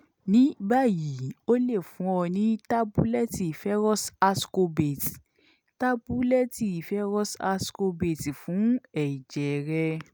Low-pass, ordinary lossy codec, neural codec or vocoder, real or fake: none; none; none; real